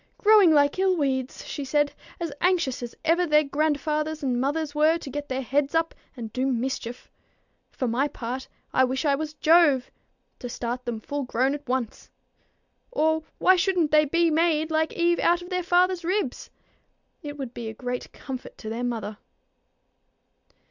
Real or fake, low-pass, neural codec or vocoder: real; 7.2 kHz; none